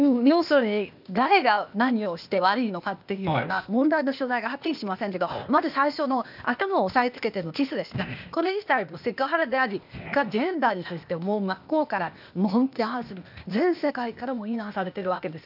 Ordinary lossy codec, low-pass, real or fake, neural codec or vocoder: none; 5.4 kHz; fake; codec, 16 kHz, 0.8 kbps, ZipCodec